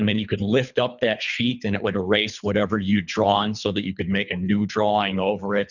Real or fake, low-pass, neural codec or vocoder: fake; 7.2 kHz; codec, 24 kHz, 3 kbps, HILCodec